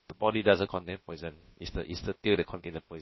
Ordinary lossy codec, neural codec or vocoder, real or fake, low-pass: MP3, 24 kbps; codec, 16 kHz, about 1 kbps, DyCAST, with the encoder's durations; fake; 7.2 kHz